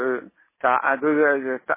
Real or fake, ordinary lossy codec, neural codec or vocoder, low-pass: real; MP3, 16 kbps; none; 3.6 kHz